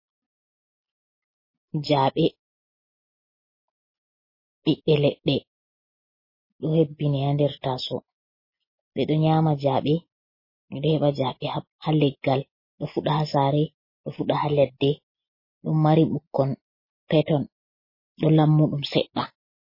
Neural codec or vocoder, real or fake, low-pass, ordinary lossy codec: none; real; 5.4 kHz; MP3, 24 kbps